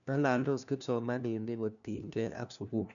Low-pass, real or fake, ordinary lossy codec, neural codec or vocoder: 7.2 kHz; fake; none; codec, 16 kHz, 1 kbps, FunCodec, trained on LibriTTS, 50 frames a second